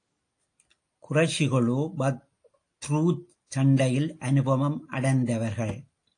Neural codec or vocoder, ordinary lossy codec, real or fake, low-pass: none; AAC, 48 kbps; real; 9.9 kHz